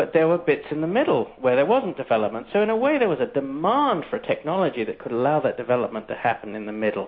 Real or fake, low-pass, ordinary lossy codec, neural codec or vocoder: real; 5.4 kHz; MP3, 32 kbps; none